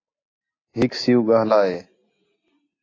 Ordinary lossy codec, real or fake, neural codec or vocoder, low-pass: AAC, 32 kbps; real; none; 7.2 kHz